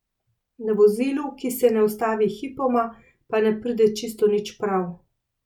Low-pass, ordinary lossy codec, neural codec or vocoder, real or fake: 19.8 kHz; none; none; real